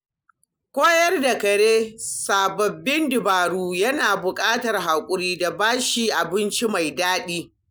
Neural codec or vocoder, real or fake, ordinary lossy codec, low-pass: none; real; none; none